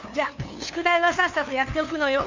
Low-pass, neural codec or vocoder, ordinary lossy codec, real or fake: 7.2 kHz; codec, 16 kHz, 2 kbps, FunCodec, trained on LibriTTS, 25 frames a second; Opus, 64 kbps; fake